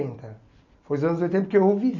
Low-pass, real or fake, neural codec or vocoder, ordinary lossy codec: 7.2 kHz; real; none; none